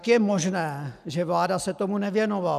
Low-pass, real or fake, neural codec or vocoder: 14.4 kHz; real; none